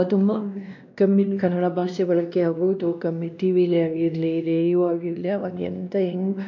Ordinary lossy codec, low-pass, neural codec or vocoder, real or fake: none; 7.2 kHz; codec, 16 kHz, 1 kbps, X-Codec, WavLM features, trained on Multilingual LibriSpeech; fake